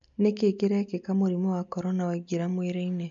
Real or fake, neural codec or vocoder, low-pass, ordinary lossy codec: real; none; 7.2 kHz; MP3, 48 kbps